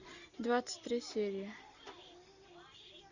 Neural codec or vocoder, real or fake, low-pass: none; real; 7.2 kHz